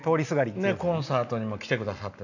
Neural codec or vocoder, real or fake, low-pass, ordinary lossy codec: none; real; 7.2 kHz; none